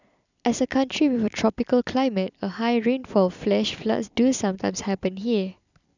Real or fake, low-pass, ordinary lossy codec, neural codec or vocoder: fake; 7.2 kHz; none; vocoder, 44.1 kHz, 128 mel bands every 256 samples, BigVGAN v2